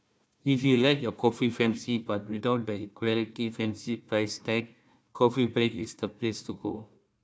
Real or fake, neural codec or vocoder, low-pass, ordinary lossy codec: fake; codec, 16 kHz, 1 kbps, FunCodec, trained on Chinese and English, 50 frames a second; none; none